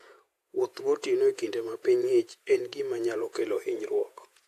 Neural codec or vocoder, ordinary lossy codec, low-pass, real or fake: vocoder, 48 kHz, 128 mel bands, Vocos; AAC, 64 kbps; 14.4 kHz; fake